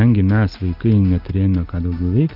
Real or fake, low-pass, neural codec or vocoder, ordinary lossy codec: real; 5.4 kHz; none; Opus, 32 kbps